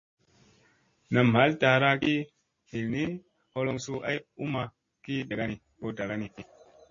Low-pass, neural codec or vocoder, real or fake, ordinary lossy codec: 7.2 kHz; none; real; MP3, 32 kbps